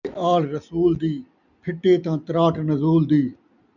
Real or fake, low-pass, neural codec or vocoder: real; 7.2 kHz; none